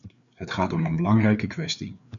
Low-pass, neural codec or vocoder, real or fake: 7.2 kHz; codec, 16 kHz, 4 kbps, FreqCodec, larger model; fake